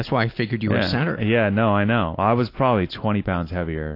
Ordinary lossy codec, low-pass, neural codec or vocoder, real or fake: AAC, 32 kbps; 5.4 kHz; none; real